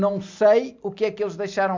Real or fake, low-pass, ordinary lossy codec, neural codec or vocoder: real; 7.2 kHz; MP3, 64 kbps; none